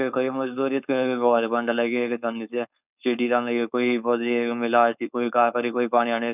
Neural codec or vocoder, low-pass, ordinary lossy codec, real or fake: codec, 16 kHz, 4.8 kbps, FACodec; 3.6 kHz; none; fake